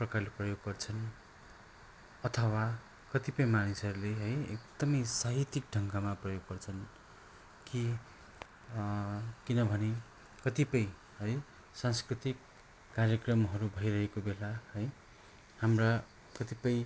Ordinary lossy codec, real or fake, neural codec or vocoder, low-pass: none; real; none; none